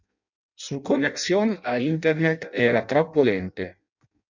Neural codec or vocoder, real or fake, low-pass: codec, 16 kHz in and 24 kHz out, 0.6 kbps, FireRedTTS-2 codec; fake; 7.2 kHz